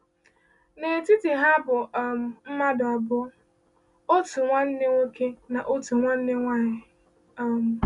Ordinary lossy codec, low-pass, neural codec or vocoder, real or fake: none; 10.8 kHz; none; real